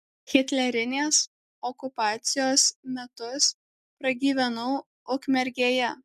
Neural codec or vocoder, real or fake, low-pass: none; real; 14.4 kHz